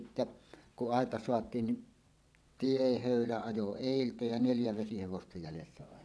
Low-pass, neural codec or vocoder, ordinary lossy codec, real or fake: none; vocoder, 22.05 kHz, 80 mel bands, WaveNeXt; none; fake